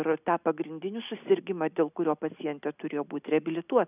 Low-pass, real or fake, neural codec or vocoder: 3.6 kHz; real; none